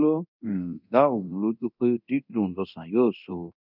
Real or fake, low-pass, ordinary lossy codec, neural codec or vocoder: fake; 5.4 kHz; none; codec, 24 kHz, 0.9 kbps, DualCodec